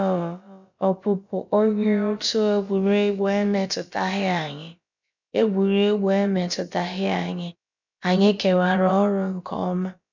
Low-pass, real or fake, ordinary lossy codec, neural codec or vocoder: 7.2 kHz; fake; none; codec, 16 kHz, about 1 kbps, DyCAST, with the encoder's durations